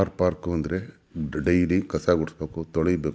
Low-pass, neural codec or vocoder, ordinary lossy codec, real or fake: none; none; none; real